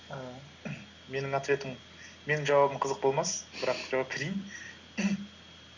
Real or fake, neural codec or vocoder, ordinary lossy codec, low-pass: real; none; Opus, 64 kbps; 7.2 kHz